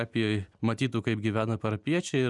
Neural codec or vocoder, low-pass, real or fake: none; 10.8 kHz; real